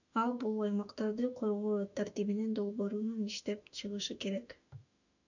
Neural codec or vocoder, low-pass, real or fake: autoencoder, 48 kHz, 32 numbers a frame, DAC-VAE, trained on Japanese speech; 7.2 kHz; fake